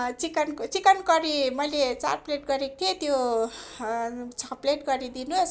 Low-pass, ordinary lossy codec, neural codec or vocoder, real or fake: none; none; none; real